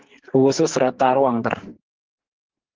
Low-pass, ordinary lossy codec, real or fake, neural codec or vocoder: 7.2 kHz; Opus, 16 kbps; fake; codec, 44.1 kHz, 2.6 kbps, SNAC